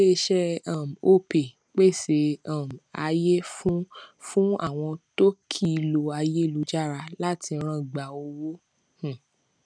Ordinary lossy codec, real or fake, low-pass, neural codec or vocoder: none; real; 9.9 kHz; none